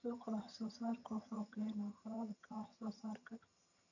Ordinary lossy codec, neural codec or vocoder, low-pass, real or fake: none; vocoder, 22.05 kHz, 80 mel bands, HiFi-GAN; 7.2 kHz; fake